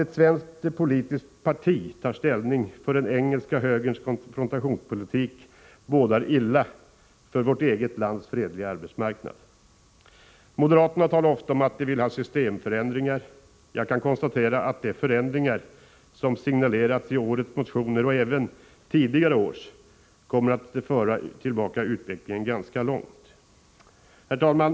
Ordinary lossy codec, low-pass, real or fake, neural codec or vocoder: none; none; real; none